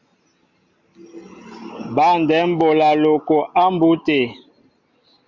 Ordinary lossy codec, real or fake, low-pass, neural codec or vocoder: Opus, 64 kbps; real; 7.2 kHz; none